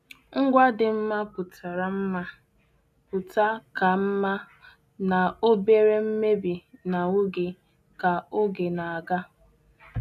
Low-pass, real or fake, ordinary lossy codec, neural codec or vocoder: 14.4 kHz; real; none; none